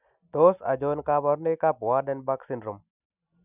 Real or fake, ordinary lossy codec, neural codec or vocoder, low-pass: real; none; none; 3.6 kHz